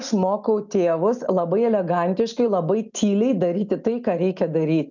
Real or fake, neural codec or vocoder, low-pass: real; none; 7.2 kHz